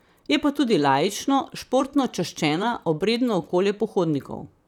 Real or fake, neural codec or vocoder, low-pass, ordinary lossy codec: fake; vocoder, 44.1 kHz, 128 mel bands, Pupu-Vocoder; 19.8 kHz; none